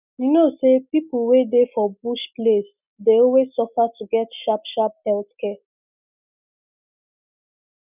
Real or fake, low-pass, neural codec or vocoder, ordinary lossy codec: real; 3.6 kHz; none; none